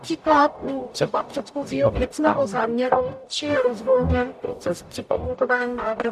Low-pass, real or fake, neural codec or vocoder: 14.4 kHz; fake; codec, 44.1 kHz, 0.9 kbps, DAC